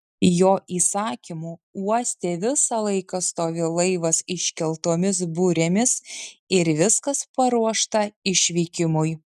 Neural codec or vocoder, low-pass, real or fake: none; 14.4 kHz; real